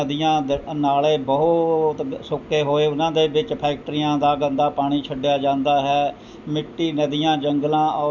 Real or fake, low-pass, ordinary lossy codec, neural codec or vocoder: real; 7.2 kHz; none; none